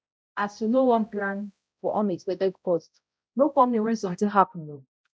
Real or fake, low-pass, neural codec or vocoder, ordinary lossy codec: fake; none; codec, 16 kHz, 0.5 kbps, X-Codec, HuBERT features, trained on balanced general audio; none